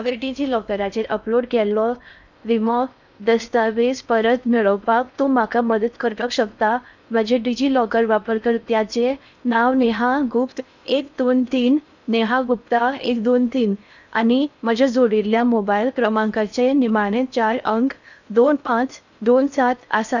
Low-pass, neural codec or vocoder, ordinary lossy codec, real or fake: 7.2 kHz; codec, 16 kHz in and 24 kHz out, 0.6 kbps, FocalCodec, streaming, 4096 codes; none; fake